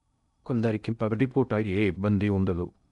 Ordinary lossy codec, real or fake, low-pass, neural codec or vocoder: none; fake; 10.8 kHz; codec, 16 kHz in and 24 kHz out, 0.6 kbps, FocalCodec, streaming, 2048 codes